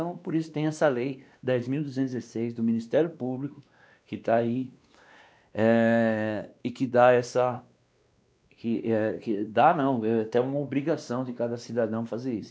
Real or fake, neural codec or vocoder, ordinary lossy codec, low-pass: fake; codec, 16 kHz, 2 kbps, X-Codec, WavLM features, trained on Multilingual LibriSpeech; none; none